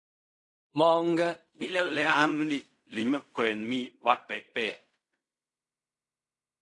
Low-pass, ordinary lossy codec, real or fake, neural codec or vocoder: 10.8 kHz; AAC, 64 kbps; fake; codec, 16 kHz in and 24 kHz out, 0.4 kbps, LongCat-Audio-Codec, fine tuned four codebook decoder